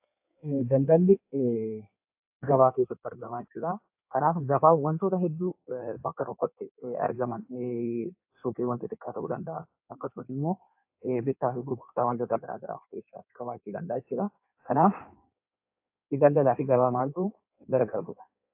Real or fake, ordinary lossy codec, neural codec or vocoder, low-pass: fake; AAC, 32 kbps; codec, 16 kHz in and 24 kHz out, 1.1 kbps, FireRedTTS-2 codec; 3.6 kHz